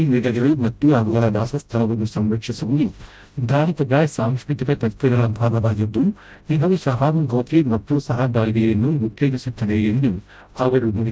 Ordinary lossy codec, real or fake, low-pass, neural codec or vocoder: none; fake; none; codec, 16 kHz, 0.5 kbps, FreqCodec, smaller model